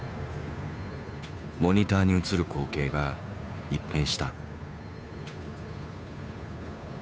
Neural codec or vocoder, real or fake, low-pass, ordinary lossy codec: codec, 16 kHz, 2 kbps, FunCodec, trained on Chinese and English, 25 frames a second; fake; none; none